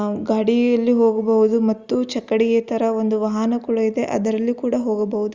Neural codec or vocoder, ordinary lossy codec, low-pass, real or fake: none; Opus, 32 kbps; 7.2 kHz; real